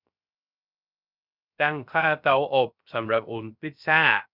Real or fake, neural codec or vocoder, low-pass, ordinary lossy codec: fake; codec, 16 kHz, 0.3 kbps, FocalCodec; 5.4 kHz; none